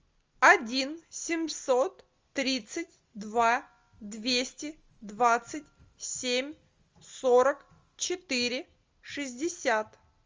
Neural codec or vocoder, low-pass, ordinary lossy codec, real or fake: none; 7.2 kHz; Opus, 32 kbps; real